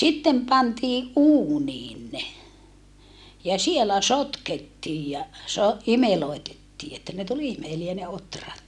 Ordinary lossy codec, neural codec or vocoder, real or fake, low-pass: none; none; real; none